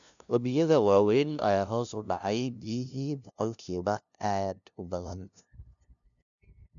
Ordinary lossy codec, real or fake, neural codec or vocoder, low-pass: none; fake; codec, 16 kHz, 0.5 kbps, FunCodec, trained on LibriTTS, 25 frames a second; 7.2 kHz